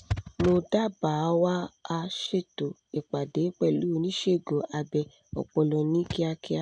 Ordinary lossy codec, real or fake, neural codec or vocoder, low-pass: none; real; none; 9.9 kHz